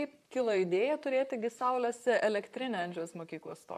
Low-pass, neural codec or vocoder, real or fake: 14.4 kHz; vocoder, 44.1 kHz, 128 mel bands, Pupu-Vocoder; fake